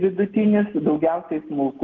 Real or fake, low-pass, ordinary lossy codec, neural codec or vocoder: real; 7.2 kHz; Opus, 32 kbps; none